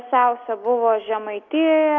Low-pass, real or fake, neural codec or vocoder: 7.2 kHz; real; none